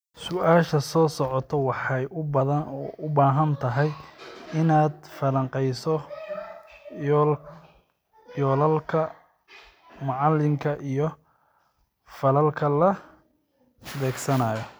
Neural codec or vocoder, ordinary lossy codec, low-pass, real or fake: none; none; none; real